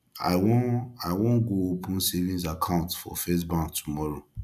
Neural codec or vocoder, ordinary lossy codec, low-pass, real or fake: vocoder, 44.1 kHz, 128 mel bands every 256 samples, BigVGAN v2; none; 14.4 kHz; fake